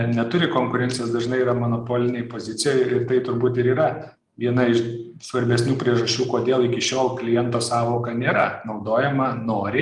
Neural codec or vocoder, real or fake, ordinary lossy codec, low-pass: none; real; Opus, 32 kbps; 10.8 kHz